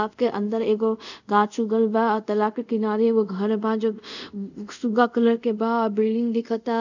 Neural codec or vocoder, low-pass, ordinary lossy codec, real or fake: codec, 24 kHz, 0.5 kbps, DualCodec; 7.2 kHz; none; fake